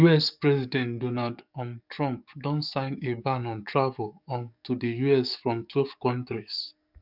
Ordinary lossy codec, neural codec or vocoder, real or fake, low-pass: none; codec, 44.1 kHz, 7.8 kbps, DAC; fake; 5.4 kHz